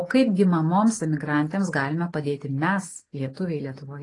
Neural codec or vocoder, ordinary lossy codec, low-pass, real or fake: none; AAC, 32 kbps; 10.8 kHz; real